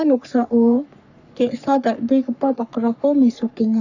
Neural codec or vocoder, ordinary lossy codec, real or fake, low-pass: codec, 44.1 kHz, 3.4 kbps, Pupu-Codec; none; fake; 7.2 kHz